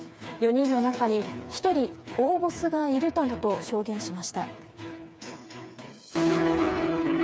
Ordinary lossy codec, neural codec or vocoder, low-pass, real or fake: none; codec, 16 kHz, 4 kbps, FreqCodec, smaller model; none; fake